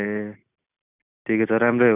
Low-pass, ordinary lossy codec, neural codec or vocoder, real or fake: 3.6 kHz; none; none; real